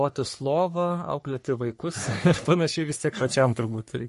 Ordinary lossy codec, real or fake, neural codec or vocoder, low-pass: MP3, 48 kbps; fake; codec, 44.1 kHz, 3.4 kbps, Pupu-Codec; 14.4 kHz